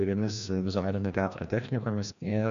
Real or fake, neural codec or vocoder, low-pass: fake; codec, 16 kHz, 1 kbps, FreqCodec, larger model; 7.2 kHz